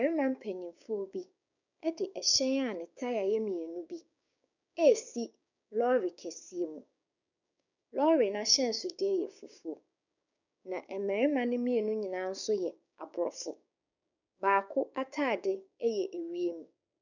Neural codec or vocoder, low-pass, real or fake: codec, 16 kHz, 6 kbps, DAC; 7.2 kHz; fake